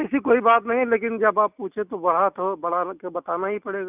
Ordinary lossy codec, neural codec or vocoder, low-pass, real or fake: none; none; 3.6 kHz; real